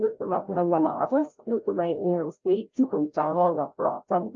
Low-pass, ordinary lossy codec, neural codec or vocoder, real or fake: 7.2 kHz; Opus, 24 kbps; codec, 16 kHz, 0.5 kbps, FreqCodec, larger model; fake